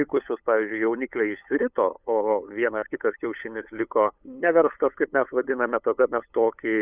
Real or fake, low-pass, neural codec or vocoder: fake; 3.6 kHz; codec, 16 kHz, 8 kbps, FunCodec, trained on LibriTTS, 25 frames a second